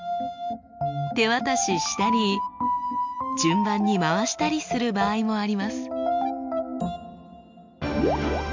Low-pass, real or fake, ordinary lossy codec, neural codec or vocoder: 7.2 kHz; real; MP3, 64 kbps; none